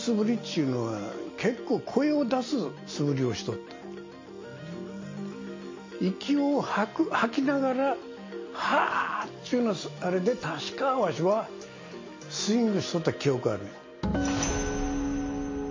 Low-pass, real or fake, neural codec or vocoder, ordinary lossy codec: 7.2 kHz; real; none; MP3, 32 kbps